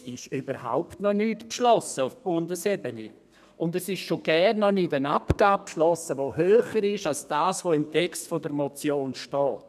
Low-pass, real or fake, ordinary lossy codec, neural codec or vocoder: 14.4 kHz; fake; none; codec, 32 kHz, 1.9 kbps, SNAC